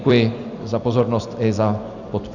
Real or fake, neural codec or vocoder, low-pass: fake; vocoder, 44.1 kHz, 128 mel bands every 256 samples, BigVGAN v2; 7.2 kHz